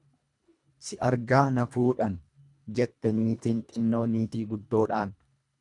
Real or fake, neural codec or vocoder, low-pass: fake; codec, 24 kHz, 1.5 kbps, HILCodec; 10.8 kHz